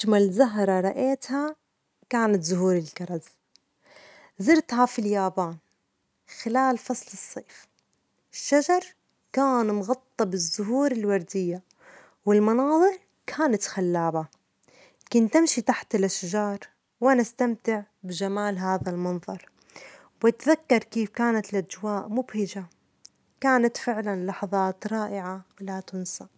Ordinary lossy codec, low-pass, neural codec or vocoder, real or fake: none; none; none; real